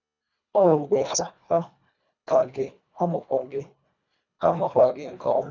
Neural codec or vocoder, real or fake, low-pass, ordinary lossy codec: codec, 24 kHz, 1.5 kbps, HILCodec; fake; 7.2 kHz; none